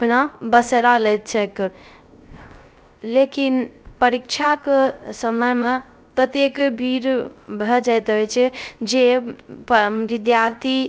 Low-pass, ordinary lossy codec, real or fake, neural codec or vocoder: none; none; fake; codec, 16 kHz, 0.3 kbps, FocalCodec